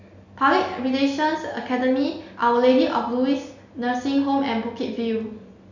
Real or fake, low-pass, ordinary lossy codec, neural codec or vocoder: real; 7.2 kHz; none; none